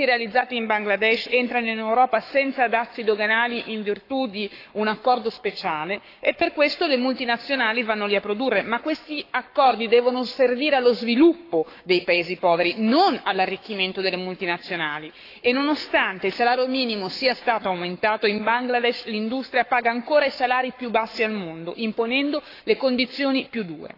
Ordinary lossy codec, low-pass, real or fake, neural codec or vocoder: AAC, 32 kbps; 5.4 kHz; fake; codec, 44.1 kHz, 7.8 kbps, Pupu-Codec